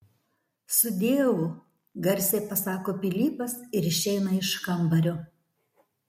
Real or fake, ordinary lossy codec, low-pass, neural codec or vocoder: real; MP3, 64 kbps; 19.8 kHz; none